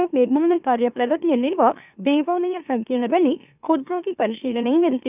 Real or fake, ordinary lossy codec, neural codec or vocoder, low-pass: fake; none; autoencoder, 44.1 kHz, a latent of 192 numbers a frame, MeloTTS; 3.6 kHz